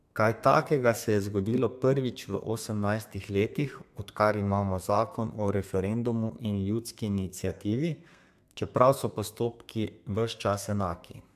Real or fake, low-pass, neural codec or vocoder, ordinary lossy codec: fake; 14.4 kHz; codec, 32 kHz, 1.9 kbps, SNAC; AAC, 96 kbps